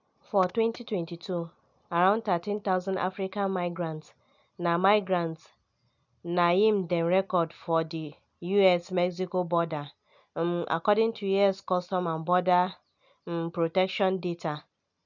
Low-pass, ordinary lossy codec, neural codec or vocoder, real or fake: 7.2 kHz; none; none; real